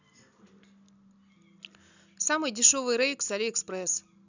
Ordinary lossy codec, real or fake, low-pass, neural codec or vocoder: none; real; 7.2 kHz; none